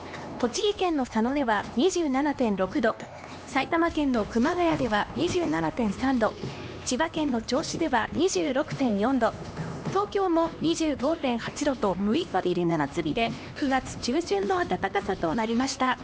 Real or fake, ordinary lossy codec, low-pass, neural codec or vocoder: fake; none; none; codec, 16 kHz, 2 kbps, X-Codec, HuBERT features, trained on LibriSpeech